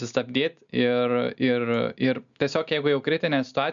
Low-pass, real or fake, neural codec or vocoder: 7.2 kHz; real; none